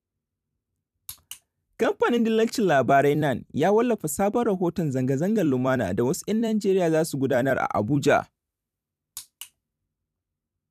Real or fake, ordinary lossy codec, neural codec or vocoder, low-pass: fake; none; vocoder, 44.1 kHz, 128 mel bands every 256 samples, BigVGAN v2; 14.4 kHz